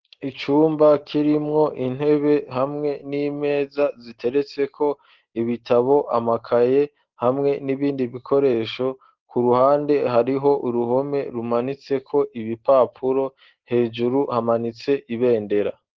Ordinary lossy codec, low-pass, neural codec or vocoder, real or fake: Opus, 16 kbps; 7.2 kHz; none; real